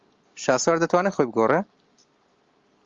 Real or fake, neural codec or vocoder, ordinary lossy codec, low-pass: real; none; Opus, 32 kbps; 7.2 kHz